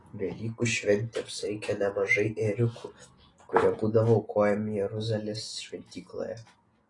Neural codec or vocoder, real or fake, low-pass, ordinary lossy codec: none; real; 10.8 kHz; AAC, 32 kbps